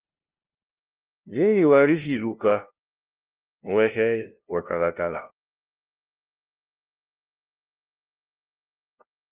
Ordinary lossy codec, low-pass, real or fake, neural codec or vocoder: Opus, 24 kbps; 3.6 kHz; fake; codec, 16 kHz, 0.5 kbps, FunCodec, trained on LibriTTS, 25 frames a second